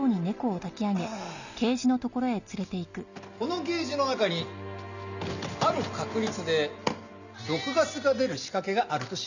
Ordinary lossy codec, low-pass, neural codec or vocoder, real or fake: none; 7.2 kHz; none; real